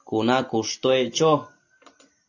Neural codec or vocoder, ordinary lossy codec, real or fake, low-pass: none; AAC, 48 kbps; real; 7.2 kHz